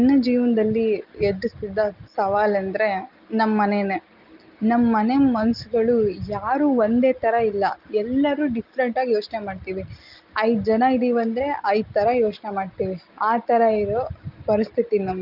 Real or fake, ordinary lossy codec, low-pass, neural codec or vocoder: real; Opus, 32 kbps; 5.4 kHz; none